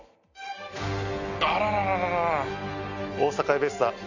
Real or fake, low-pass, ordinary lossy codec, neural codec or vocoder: real; 7.2 kHz; none; none